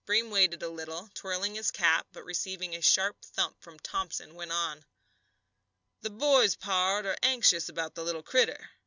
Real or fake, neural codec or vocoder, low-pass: real; none; 7.2 kHz